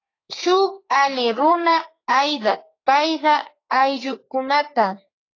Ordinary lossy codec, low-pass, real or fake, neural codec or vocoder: AAC, 32 kbps; 7.2 kHz; fake; codec, 32 kHz, 1.9 kbps, SNAC